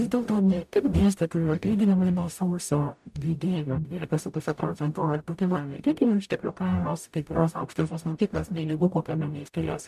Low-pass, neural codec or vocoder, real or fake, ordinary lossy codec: 14.4 kHz; codec, 44.1 kHz, 0.9 kbps, DAC; fake; MP3, 96 kbps